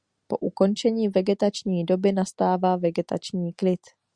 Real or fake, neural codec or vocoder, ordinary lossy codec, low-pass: real; none; Opus, 64 kbps; 9.9 kHz